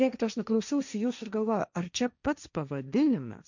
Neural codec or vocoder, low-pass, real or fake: codec, 16 kHz, 1.1 kbps, Voila-Tokenizer; 7.2 kHz; fake